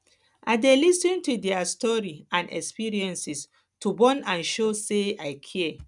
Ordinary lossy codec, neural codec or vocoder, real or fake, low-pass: none; none; real; 10.8 kHz